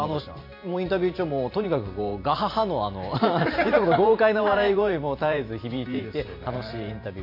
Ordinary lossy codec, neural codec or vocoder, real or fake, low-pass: MP3, 32 kbps; vocoder, 44.1 kHz, 128 mel bands every 512 samples, BigVGAN v2; fake; 5.4 kHz